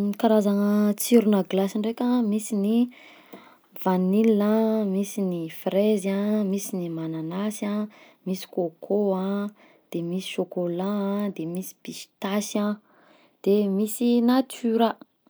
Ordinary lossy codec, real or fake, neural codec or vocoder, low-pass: none; real; none; none